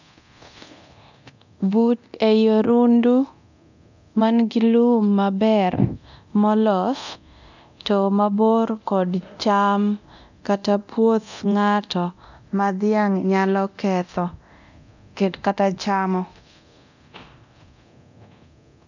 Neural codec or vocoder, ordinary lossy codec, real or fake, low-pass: codec, 24 kHz, 0.9 kbps, DualCodec; none; fake; 7.2 kHz